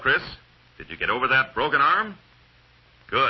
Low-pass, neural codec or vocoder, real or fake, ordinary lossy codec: 7.2 kHz; none; real; MP3, 24 kbps